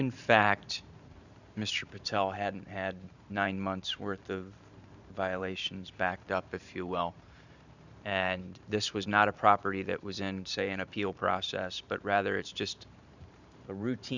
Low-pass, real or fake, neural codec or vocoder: 7.2 kHz; fake; vocoder, 44.1 kHz, 128 mel bands every 512 samples, BigVGAN v2